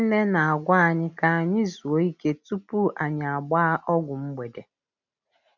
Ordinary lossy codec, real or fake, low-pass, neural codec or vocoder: none; real; 7.2 kHz; none